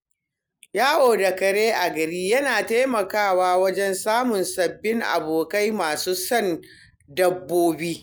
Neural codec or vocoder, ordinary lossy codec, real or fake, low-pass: none; none; real; none